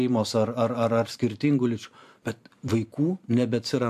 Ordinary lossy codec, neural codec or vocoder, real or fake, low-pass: AAC, 96 kbps; vocoder, 44.1 kHz, 128 mel bands every 512 samples, BigVGAN v2; fake; 14.4 kHz